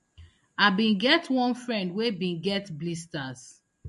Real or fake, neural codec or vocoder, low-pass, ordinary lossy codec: real; none; 14.4 kHz; MP3, 48 kbps